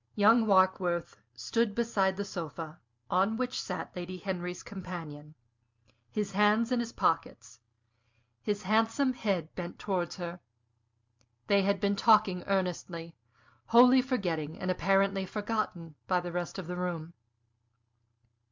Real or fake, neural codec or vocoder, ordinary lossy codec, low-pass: real; none; MP3, 64 kbps; 7.2 kHz